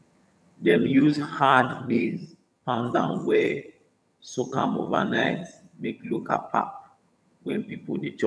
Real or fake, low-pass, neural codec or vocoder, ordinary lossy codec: fake; none; vocoder, 22.05 kHz, 80 mel bands, HiFi-GAN; none